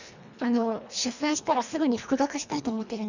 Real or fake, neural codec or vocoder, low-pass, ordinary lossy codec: fake; codec, 24 kHz, 1.5 kbps, HILCodec; 7.2 kHz; none